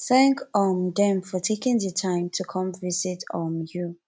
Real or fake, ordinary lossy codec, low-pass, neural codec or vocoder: real; none; none; none